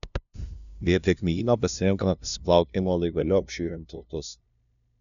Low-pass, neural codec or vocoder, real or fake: 7.2 kHz; codec, 16 kHz, 0.5 kbps, FunCodec, trained on LibriTTS, 25 frames a second; fake